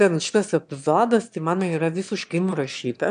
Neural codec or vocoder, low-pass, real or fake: autoencoder, 22.05 kHz, a latent of 192 numbers a frame, VITS, trained on one speaker; 9.9 kHz; fake